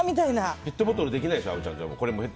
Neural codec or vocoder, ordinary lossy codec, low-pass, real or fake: none; none; none; real